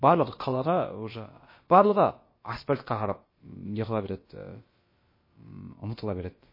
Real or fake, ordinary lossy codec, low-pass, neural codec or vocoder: fake; MP3, 24 kbps; 5.4 kHz; codec, 16 kHz, about 1 kbps, DyCAST, with the encoder's durations